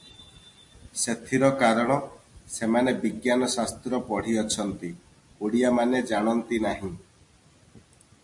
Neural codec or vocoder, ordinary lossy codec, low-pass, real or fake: none; MP3, 48 kbps; 10.8 kHz; real